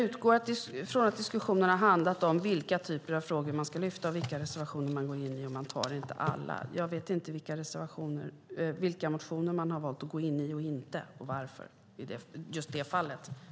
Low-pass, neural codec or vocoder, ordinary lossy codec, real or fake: none; none; none; real